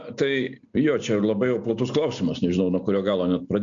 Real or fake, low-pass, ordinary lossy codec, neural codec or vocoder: real; 7.2 kHz; MP3, 64 kbps; none